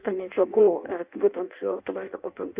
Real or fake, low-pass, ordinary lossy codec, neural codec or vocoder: fake; 3.6 kHz; Opus, 64 kbps; codec, 16 kHz in and 24 kHz out, 0.6 kbps, FireRedTTS-2 codec